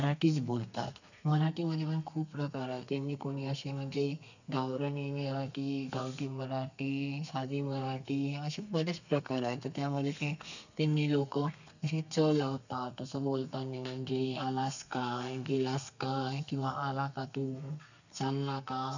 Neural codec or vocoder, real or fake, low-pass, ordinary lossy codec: codec, 32 kHz, 1.9 kbps, SNAC; fake; 7.2 kHz; none